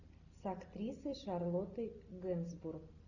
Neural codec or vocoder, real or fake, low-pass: none; real; 7.2 kHz